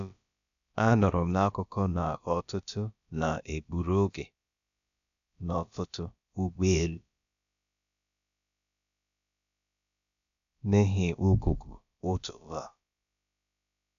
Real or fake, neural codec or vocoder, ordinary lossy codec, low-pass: fake; codec, 16 kHz, about 1 kbps, DyCAST, with the encoder's durations; AAC, 96 kbps; 7.2 kHz